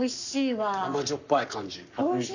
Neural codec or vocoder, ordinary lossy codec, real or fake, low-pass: codec, 44.1 kHz, 7.8 kbps, Pupu-Codec; none; fake; 7.2 kHz